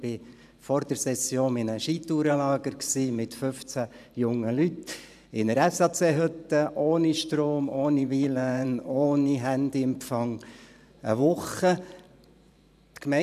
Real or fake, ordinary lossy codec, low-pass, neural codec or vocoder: fake; none; 14.4 kHz; vocoder, 44.1 kHz, 128 mel bands every 512 samples, BigVGAN v2